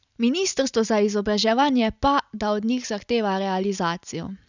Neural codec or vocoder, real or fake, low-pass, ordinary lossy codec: none; real; 7.2 kHz; none